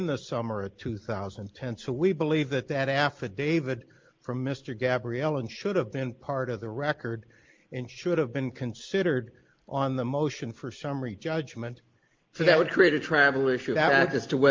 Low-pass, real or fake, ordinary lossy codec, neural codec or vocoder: 7.2 kHz; real; Opus, 32 kbps; none